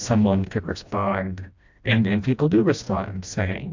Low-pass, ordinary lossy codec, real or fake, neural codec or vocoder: 7.2 kHz; AAC, 48 kbps; fake; codec, 16 kHz, 1 kbps, FreqCodec, smaller model